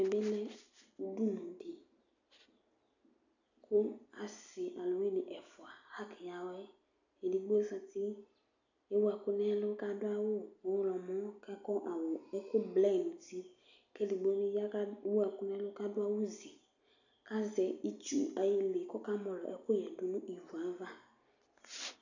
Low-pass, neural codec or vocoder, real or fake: 7.2 kHz; none; real